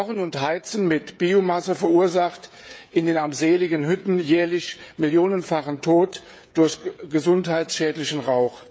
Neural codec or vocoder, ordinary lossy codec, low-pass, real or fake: codec, 16 kHz, 16 kbps, FreqCodec, smaller model; none; none; fake